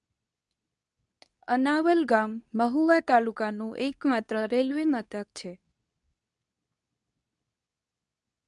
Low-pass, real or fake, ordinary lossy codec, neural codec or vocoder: 10.8 kHz; fake; none; codec, 24 kHz, 0.9 kbps, WavTokenizer, medium speech release version 2